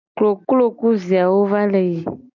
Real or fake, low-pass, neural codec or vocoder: real; 7.2 kHz; none